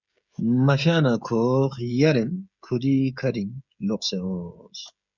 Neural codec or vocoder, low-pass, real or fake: codec, 16 kHz, 16 kbps, FreqCodec, smaller model; 7.2 kHz; fake